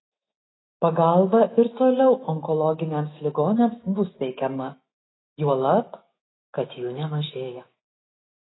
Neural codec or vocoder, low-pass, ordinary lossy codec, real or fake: autoencoder, 48 kHz, 128 numbers a frame, DAC-VAE, trained on Japanese speech; 7.2 kHz; AAC, 16 kbps; fake